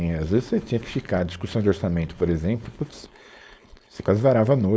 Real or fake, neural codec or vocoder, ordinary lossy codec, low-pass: fake; codec, 16 kHz, 4.8 kbps, FACodec; none; none